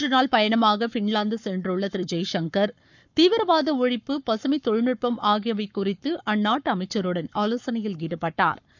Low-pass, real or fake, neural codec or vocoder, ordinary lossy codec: 7.2 kHz; fake; codec, 44.1 kHz, 7.8 kbps, Pupu-Codec; none